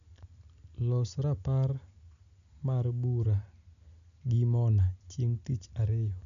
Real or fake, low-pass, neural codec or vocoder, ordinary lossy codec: real; 7.2 kHz; none; MP3, 96 kbps